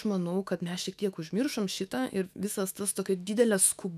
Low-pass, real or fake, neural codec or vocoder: 14.4 kHz; fake; autoencoder, 48 kHz, 128 numbers a frame, DAC-VAE, trained on Japanese speech